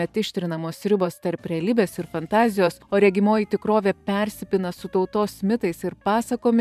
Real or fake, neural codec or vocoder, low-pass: fake; vocoder, 44.1 kHz, 128 mel bands every 512 samples, BigVGAN v2; 14.4 kHz